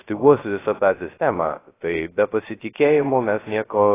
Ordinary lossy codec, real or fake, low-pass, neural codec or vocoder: AAC, 16 kbps; fake; 3.6 kHz; codec, 16 kHz, 0.3 kbps, FocalCodec